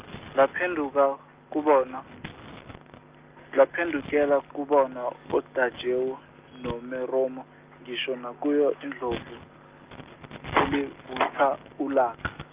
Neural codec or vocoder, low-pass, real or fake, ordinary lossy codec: none; 3.6 kHz; real; Opus, 24 kbps